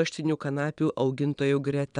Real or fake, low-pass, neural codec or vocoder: fake; 9.9 kHz; vocoder, 22.05 kHz, 80 mel bands, Vocos